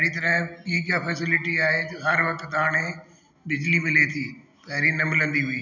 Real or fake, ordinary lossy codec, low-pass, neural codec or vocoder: real; none; 7.2 kHz; none